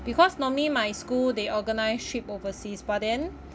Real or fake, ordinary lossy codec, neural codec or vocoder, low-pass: real; none; none; none